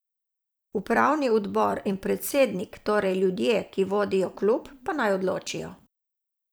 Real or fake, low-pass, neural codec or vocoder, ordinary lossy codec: fake; none; vocoder, 44.1 kHz, 128 mel bands every 256 samples, BigVGAN v2; none